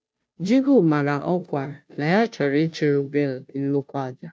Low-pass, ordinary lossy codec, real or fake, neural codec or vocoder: none; none; fake; codec, 16 kHz, 0.5 kbps, FunCodec, trained on Chinese and English, 25 frames a second